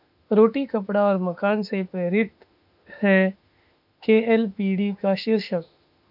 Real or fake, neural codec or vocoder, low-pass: fake; autoencoder, 48 kHz, 32 numbers a frame, DAC-VAE, trained on Japanese speech; 5.4 kHz